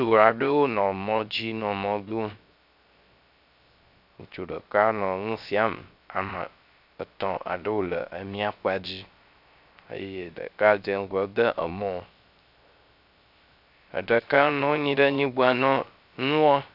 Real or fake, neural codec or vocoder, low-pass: fake; codec, 16 kHz, 0.3 kbps, FocalCodec; 5.4 kHz